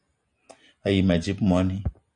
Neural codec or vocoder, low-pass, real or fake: none; 9.9 kHz; real